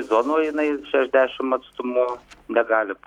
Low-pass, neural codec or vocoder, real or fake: 19.8 kHz; none; real